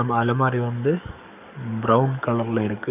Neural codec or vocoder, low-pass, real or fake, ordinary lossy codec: codec, 44.1 kHz, 7.8 kbps, DAC; 3.6 kHz; fake; none